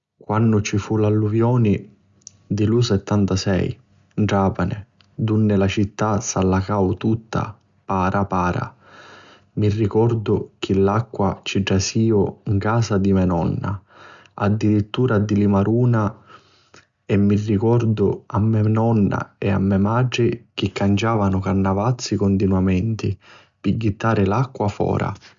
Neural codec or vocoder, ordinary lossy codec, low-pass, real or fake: none; Opus, 64 kbps; 7.2 kHz; real